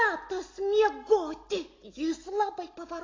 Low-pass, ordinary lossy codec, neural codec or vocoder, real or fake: 7.2 kHz; AAC, 32 kbps; none; real